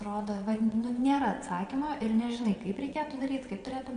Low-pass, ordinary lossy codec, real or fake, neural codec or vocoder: 9.9 kHz; Opus, 64 kbps; fake; vocoder, 22.05 kHz, 80 mel bands, WaveNeXt